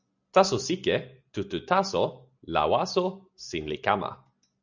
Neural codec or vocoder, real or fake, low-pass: none; real; 7.2 kHz